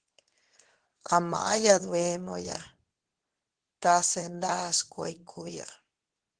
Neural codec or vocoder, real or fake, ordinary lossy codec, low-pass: codec, 24 kHz, 0.9 kbps, WavTokenizer, medium speech release version 2; fake; Opus, 16 kbps; 9.9 kHz